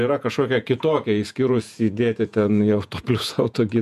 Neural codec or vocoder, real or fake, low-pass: vocoder, 48 kHz, 128 mel bands, Vocos; fake; 14.4 kHz